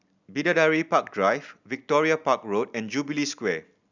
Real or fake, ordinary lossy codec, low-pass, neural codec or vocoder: real; none; 7.2 kHz; none